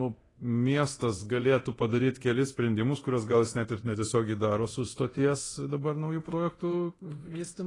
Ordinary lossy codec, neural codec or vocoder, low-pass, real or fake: AAC, 32 kbps; codec, 24 kHz, 0.9 kbps, DualCodec; 10.8 kHz; fake